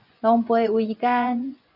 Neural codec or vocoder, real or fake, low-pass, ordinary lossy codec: vocoder, 24 kHz, 100 mel bands, Vocos; fake; 5.4 kHz; AAC, 48 kbps